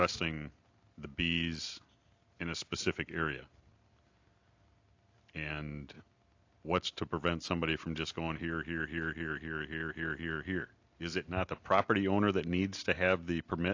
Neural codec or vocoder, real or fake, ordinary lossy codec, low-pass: none; real; AAC, 48 kbps; 7.2 kHz